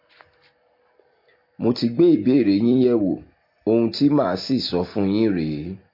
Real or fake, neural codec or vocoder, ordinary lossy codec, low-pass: real; none; MP3, 32 kbps; 5.4 kHz